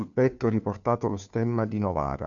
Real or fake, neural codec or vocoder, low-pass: fake; codec, 16 kHz, 2 kbps, FunCodec, trained on Chinese and English, 25 frames a second; 7.2 kHz